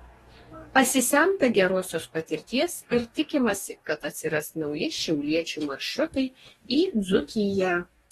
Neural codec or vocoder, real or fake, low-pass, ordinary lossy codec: codec, 44.1 kHz, 2.6 kbps, DAC; fake; 19.8 kHz; AAC, 32 kbps